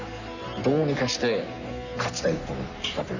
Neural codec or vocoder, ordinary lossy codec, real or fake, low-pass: codec, 44.1 kHz, 3.4 kbps, Pupu-Codec; none; fake; 7.2 kHz